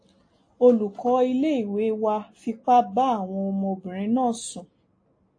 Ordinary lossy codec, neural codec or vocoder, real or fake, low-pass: AAC, 48 kbps; none; real; 9.9 kHz